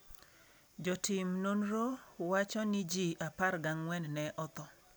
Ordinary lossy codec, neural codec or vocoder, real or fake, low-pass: none; none; real; none